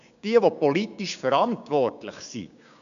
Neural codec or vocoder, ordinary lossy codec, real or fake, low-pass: codec, 16 kHz, 6 kbps, DAC; none; fake; 7.2 kHz